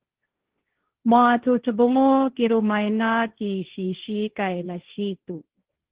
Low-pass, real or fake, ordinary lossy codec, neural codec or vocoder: 3.6 kHz; fake; Opus, 16 kbps; codec, 16 kHz, 1.1 kbps, Voila-Tokenizer